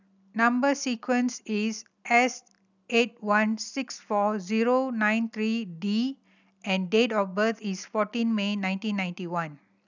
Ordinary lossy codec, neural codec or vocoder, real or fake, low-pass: none; none; real; 7.2 kHz